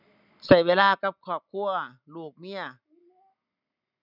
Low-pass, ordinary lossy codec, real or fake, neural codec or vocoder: 5.4 kHz; none; real; none